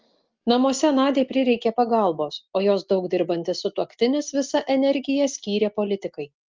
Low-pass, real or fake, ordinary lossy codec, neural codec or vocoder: 7.2 kHz; real; Opus, 32 kbps; none